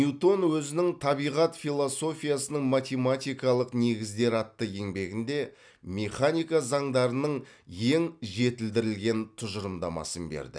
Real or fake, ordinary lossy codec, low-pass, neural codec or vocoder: real; none; 9.9 kHz; none